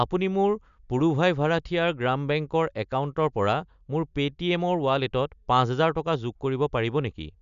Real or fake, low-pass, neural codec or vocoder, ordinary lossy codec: real; 7.2 kHz; none; none